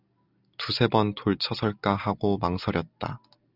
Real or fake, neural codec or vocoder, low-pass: real; none; 5.4 kHz